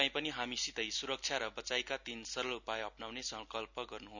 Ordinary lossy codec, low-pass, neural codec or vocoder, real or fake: none; 7.2 kHz; none; real